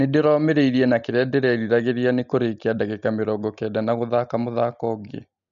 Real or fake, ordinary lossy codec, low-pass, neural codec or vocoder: real; none; 7.2 kHz; none